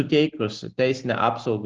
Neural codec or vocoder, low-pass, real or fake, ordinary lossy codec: none; 7.2 kHz; real; Opus, 16 kbps